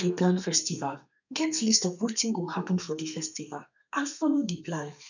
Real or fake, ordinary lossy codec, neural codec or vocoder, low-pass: fake; none; codec, 32 kHz, 1.9 kbps, SNAC; 7.2 kHz